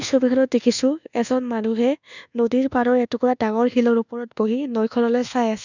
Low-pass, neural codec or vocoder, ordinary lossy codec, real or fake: 7.2 kHz; codec, 24 kHz, 1.2 kbps, DualCodec; none; fake